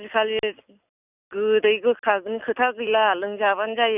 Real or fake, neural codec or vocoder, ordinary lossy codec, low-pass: real; none; none; 3.6 kHz